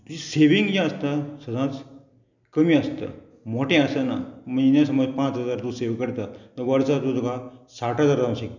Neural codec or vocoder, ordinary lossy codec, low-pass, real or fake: none; none; 7.2 kHz; real